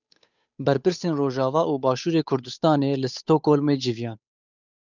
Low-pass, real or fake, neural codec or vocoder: 7.2 kHz; fake; codec, 16 kHz, 8 kbps, FunCodec, trained on Chinese and English, 25 frames a second